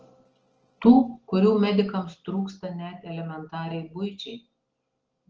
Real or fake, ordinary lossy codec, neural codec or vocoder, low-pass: real; Opus, 16 kbps; none; 7.2 kHz